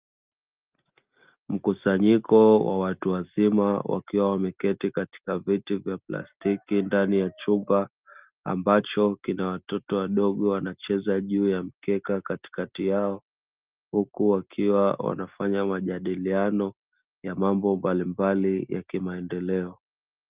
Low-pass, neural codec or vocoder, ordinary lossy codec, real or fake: 3.6 kHz; none; Opus, 32 kbps; real